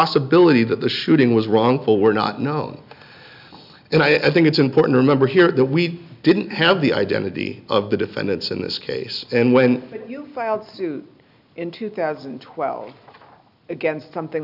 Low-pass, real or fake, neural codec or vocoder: 5.4 kHz; real; none